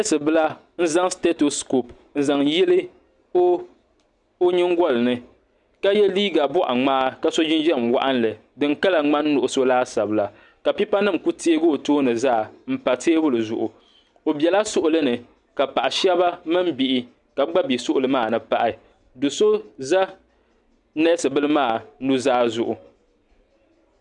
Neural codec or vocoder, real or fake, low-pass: none; real; 10.8 kHz